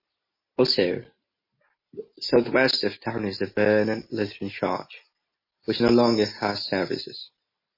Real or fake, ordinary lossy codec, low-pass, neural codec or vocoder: real; MP3, 24 kbps; 5.4 kHz; none